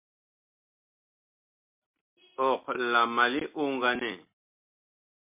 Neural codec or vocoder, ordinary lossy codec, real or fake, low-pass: none; MP3, 32 kbps; real; 3.6 kHz